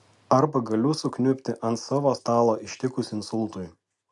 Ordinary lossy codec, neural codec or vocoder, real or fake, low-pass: MP3, 64 kbps; none; real; 10.8 kHz